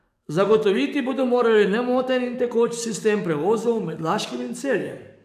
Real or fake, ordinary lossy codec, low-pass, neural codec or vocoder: fake; none; 14.4 kHz; codec, 44.1 kHz, 7.8 kbps, DAC